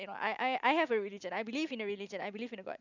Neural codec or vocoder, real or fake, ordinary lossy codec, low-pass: none; real; none; 7.2 kHz